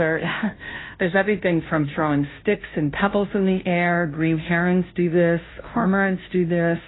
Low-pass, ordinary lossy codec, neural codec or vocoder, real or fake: 7.2 kHz; AAC, 16 kbps; codec, 16 kHz, 0.5 kbps, FunCodec, trained on Chinese and English, 25 frames a second; fake